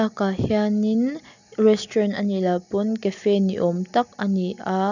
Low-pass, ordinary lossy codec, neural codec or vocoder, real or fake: 7.2 kHz; none; none; real